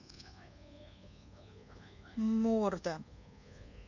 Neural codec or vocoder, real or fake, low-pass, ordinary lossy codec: codec, 24 kHz, 1.2 kbps, DualCodec; fake; 7.2 kHz; none